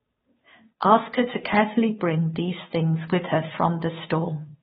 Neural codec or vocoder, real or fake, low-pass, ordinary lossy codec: codec, 16 kHz, 2 kbps, FunCodec, trained on Chinese and English, 25 frames a second; fake; 7.2 kHz; AAC, 16 kbps